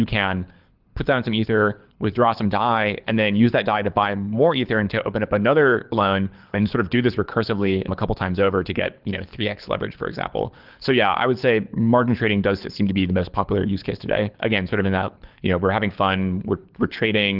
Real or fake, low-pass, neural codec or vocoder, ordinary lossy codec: fake; 5.4 kHz; codec, 24 kHz, 6 kbps, HILCodec; Opus, 32 kbps